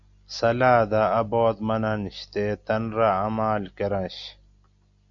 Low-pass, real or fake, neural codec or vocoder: 7.2 kHz; real; none